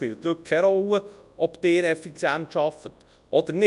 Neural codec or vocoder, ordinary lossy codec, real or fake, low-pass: codec, 24 kHz, 0.9 kbps, WavTokenizer, large speech release; none; fake; 10.8 kHz